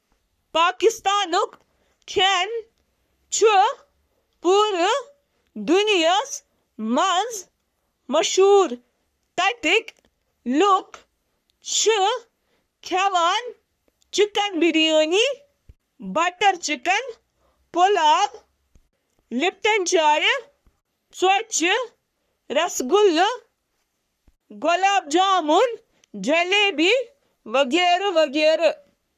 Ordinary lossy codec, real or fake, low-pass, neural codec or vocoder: none; fake; 14.4 kHz; codec, 44.1 kHz, 3.4 kbps, Pupu-Codec